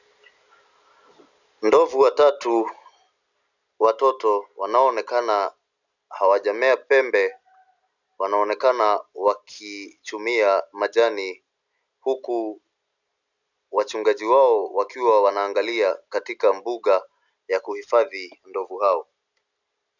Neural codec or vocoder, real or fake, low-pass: none; real; 7.2 kHz